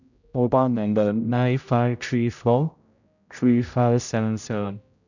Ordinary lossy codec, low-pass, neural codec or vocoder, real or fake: none; 7.2 kHz; codec, 16 kHz, 0.5 kbps, X-Codec, HuBERT features, trained on general audio; fake